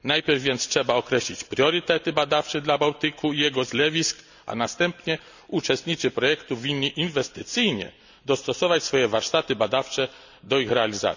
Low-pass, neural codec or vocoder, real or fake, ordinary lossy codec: 7.2 kHz; none; real; none